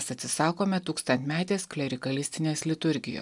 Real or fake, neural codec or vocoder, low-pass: real; none; 10.8 kHz